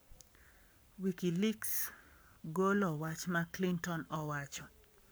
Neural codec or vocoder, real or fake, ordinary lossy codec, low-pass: codec, 44.1 kHz, 7.8 kbps, Pupu-Codec; fake; none; none